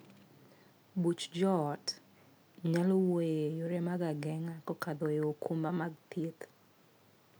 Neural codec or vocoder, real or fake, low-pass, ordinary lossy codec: none; real; none; none